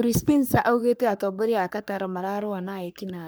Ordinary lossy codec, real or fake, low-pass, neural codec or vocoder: none; fake; none; codec, 44.1 kHz, 3.4 kbps, Pupu-Codec